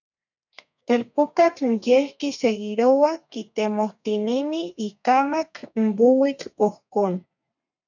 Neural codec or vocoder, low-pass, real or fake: codec, 32 kHz, 1.9 kbps, SNAC; 7.2 kHz; fake